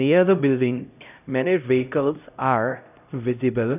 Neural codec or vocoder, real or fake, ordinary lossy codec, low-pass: codec, 16 kHz, 0.5 kbps, X-Codec, HuBERT features, trained on LibriSpeech; fake; none; 3.6 kHz